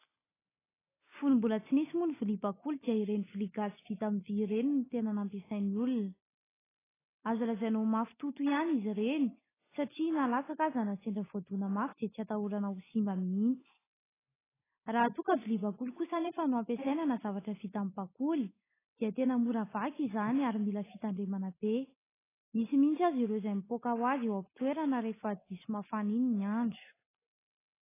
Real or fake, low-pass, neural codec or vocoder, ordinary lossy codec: real; 3.6 kHz; none; AAC, 16 kbps